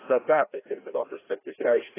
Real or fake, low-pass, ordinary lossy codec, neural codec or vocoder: fake; 3.6 kHz; AAC, 16 kbps; codec, 16 kHz, 1 kbps, FreqCodec, larger model